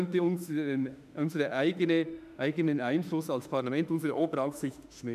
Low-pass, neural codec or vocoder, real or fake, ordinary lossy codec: 14.4 kHz; autoencoder, 48 kHz, 32 numbers a frame, DAC-VAE, trained on Japanese speech; fake; none